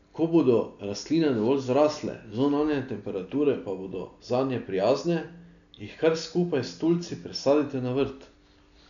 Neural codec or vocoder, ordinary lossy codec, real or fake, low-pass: none; none; real; 7.2 kHz